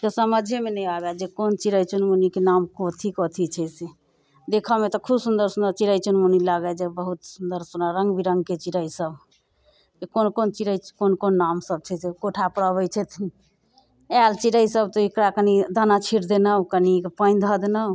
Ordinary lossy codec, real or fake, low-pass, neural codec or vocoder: none; real; none; none